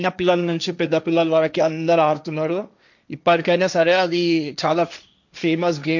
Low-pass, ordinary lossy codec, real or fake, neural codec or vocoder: 7.2 kHz; none; fake; codec, 16 kHz, 1.1 kbps, Voila-Tokenizer